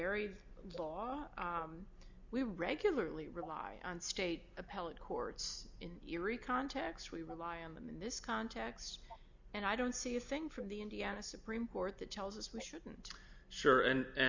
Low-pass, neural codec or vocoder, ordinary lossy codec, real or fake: 7.2 kHz; none; Opus, 64 kbps; real